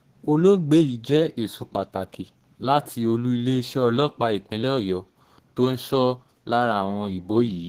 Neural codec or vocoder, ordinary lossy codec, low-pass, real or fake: codec, 32 kHz, 1.9 kbps, SNAC; Opus, 16 kbps; 14.4 kHz; fake